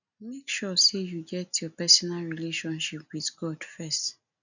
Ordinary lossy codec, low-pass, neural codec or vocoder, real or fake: none; 7.2 kHz; none; real